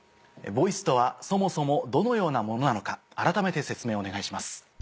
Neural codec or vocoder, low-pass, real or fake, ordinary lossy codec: none; none; real; none